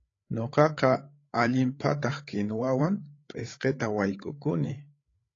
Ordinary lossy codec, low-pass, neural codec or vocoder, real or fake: AAC, 32 kbps; 7.2 kHz; codec, 16 kHz, 8 kbps, FreqCodec, larger model; fake